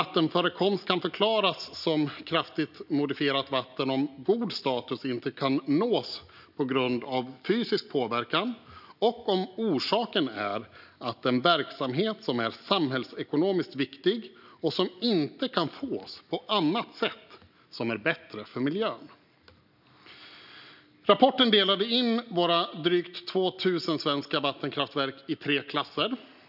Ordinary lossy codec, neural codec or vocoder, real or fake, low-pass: none; none; real; 5.4 kHz